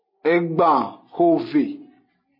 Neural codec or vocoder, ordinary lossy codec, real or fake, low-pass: none; MP3, 24 kbps; real; 5.4 kHz